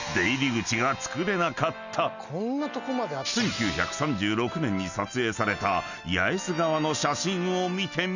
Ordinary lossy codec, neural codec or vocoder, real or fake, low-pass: none; none; real; 7.2 kHz